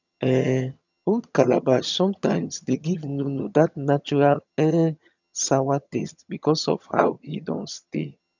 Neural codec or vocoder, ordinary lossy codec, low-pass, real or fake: vocoder, 22.05 kHz, 80 mel bands, HiFi-GAN; none; 7.2 kHz; fake